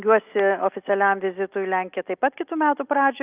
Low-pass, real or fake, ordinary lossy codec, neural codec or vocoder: 3.6 kHz; real; Opus, 24 kbps; none